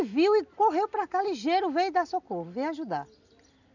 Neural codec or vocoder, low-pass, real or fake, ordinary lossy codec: none; 7.2 kHz; real; none